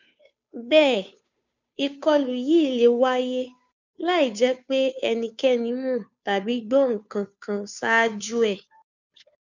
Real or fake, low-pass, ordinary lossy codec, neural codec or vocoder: fake; 7.2 kHz; none; codec, 16 kHz, 2 kbps, FunCodec, trained on Chinese and English, 25 frames a second